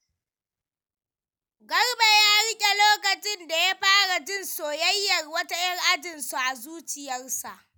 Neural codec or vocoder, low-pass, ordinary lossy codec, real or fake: none; none; none; real